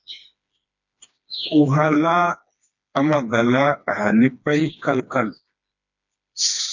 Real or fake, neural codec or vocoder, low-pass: fake; codec, 16 kHz, 2 kbps, FreqCodec, smaller model; 7.2 kHz